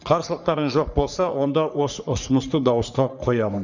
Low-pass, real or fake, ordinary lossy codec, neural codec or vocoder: 7.2 kHz; fake; none; codec, 44.1 kHz, 3.4 kbps, Pupu-Codec